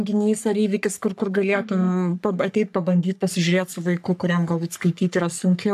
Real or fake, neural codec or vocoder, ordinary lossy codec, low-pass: fake; codec, 44.1 kHz, 3.4 kbps, Pupu-Codec; AAC, 96 kbps; 14.4 kHz